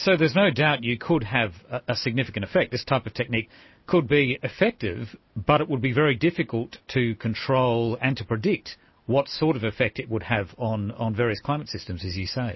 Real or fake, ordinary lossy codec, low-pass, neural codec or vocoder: real; MP3, 24 kbps; 7.2 kHz; none